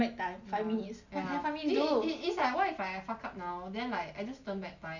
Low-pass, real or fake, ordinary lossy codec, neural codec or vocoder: 7.2 kHz; real; none; none